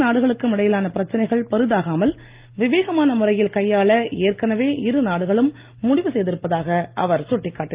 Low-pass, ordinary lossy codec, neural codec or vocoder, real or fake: 3.6 kHz; Opus, 32 kbps; none; real